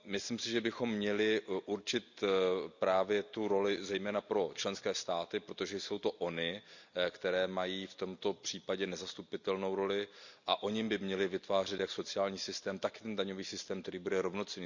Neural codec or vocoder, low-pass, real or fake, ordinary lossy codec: none; 7.2 kHz; real; none